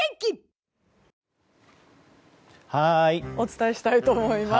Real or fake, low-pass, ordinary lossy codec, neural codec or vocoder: real; none; none; none